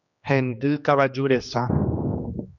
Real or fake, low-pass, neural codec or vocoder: fake; 7.2 kHz; codec, 16 kHz, 2 kbps, X-Codec, HuBERT features, trained on general audio